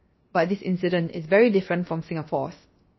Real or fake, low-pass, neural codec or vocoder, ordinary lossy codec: fake; 7.2 kHz; codec, 16 kHz, 0.7 kbps, FocalCodec; MP3, 24 kbps